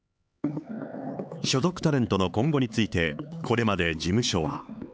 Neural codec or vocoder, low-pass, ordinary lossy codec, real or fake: codec, 16 kHz, 4 kbps, X-Codec, HuBERT features, trained on LibriSpeech; none; none; fake